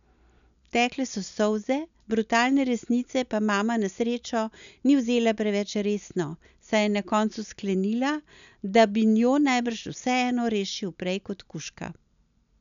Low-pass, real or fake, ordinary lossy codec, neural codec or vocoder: 7.2 kHz; real; none; none